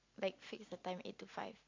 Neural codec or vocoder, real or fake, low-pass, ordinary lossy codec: none; real; 7.2 kHz; MP3, 48 kbps